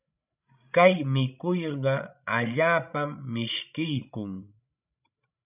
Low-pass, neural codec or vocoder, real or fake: 3.6 kHz; codec, 16 kHz, 16 kbps, FreqCodec, larger model; fake